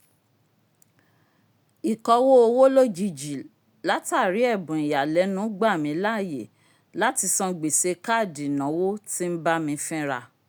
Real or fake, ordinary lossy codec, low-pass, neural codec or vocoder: real; none; none; none